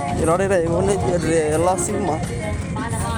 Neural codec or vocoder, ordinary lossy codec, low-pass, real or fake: none; none; none; real